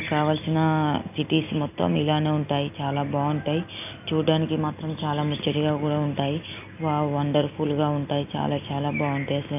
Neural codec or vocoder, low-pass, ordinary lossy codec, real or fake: none; 3.6 kHz; AAC, 32 kbps; real